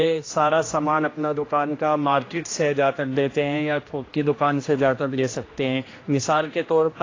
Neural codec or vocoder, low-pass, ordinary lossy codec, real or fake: codec, 16 kHz, 1 kbps, X-Codec, HuBERT features, trained on general audio; 7.2 kHz; AAC, 32 kbps; fake